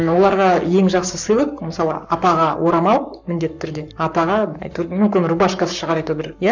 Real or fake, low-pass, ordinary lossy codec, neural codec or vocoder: fake; 7.2 kHz; none; codec, 44.1 kHz, 7.8 kbps, Pupu-Codec